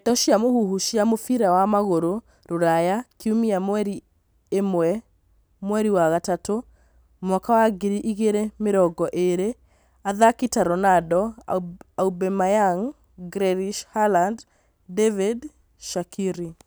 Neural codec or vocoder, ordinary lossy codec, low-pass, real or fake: none; none; none; real